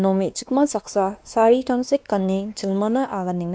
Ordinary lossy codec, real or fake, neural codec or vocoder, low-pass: none; fake; codec, 16 kHz, 2 kbps, X-Codec, WavLM features, trained on Multilingual LibriSpeech; none